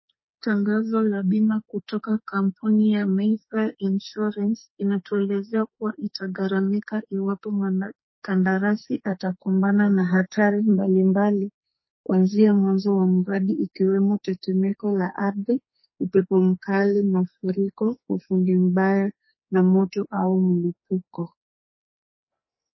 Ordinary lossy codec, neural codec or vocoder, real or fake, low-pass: MP3, 24 kbps; codec, 44.1 kHz, 2.6 kbps, SNAC; fake; 7.2 kHz